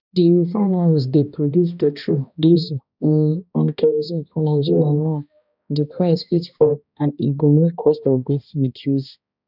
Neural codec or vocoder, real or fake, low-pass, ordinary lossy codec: codec, 16 kHz, 1 kbps, X-Codec, HuBERT features, trained on balanced general audio; fake; 5.4 kHz; none